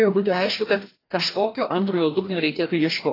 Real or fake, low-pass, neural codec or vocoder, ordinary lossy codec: fake; 5.4 kHz; codec, 16 kHz, 1 kbps, FreqCodec, larger model; AAC, 24 kbps